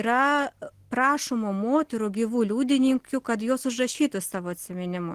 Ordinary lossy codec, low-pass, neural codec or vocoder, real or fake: Opus, 16 kbps; 14.4 kHz; none; real